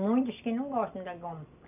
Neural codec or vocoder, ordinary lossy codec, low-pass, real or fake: none; none; 3.6 kHz; real